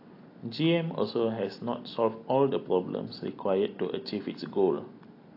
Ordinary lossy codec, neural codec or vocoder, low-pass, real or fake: AAC, 32 kbps; none; 5.4 kHz; real